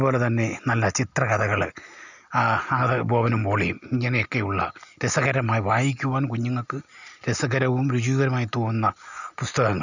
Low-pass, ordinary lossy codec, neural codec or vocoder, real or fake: 7.2 kHz; none; none; real